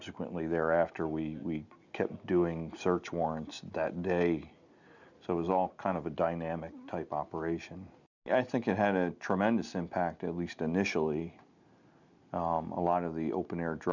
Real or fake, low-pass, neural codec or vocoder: real; 7.2 kHz; none